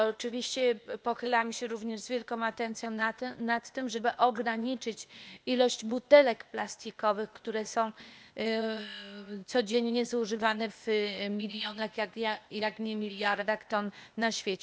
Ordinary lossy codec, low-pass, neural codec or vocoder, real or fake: none; none; codec, 16 kHz, 0.8 kbps, ZipCodec; fake